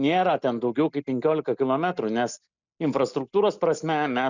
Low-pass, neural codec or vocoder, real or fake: 7.2 kHz; none; real